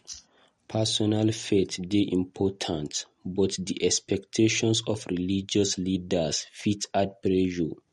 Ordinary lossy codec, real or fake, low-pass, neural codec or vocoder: MP3, 48 kbps; real; 19.8 kHz; none